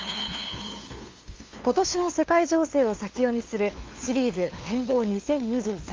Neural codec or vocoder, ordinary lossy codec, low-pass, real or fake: codec, 16 kHz, 2 kbps, FunCodec, trained on LibriTTS, 25 frames a second; Opus, 32 kbps; 7.2 kHz; fake